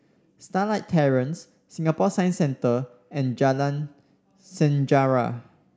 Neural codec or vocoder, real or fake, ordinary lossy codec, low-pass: none; real; none; none